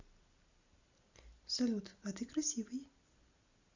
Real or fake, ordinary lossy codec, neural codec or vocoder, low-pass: real; Opus, 64 kbps; none; 7.2 kHz